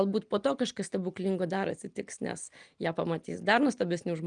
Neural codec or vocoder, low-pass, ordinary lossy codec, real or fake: none; 9.9 kHz; Opus, 32 kbps; real